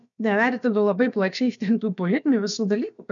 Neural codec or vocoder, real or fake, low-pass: codec, 16 kHz, about 1 kbps, DyCAST, with the encoder's durations; fake; 7.2 kHz